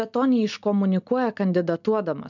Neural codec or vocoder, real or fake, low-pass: none; real; 7.2 kHz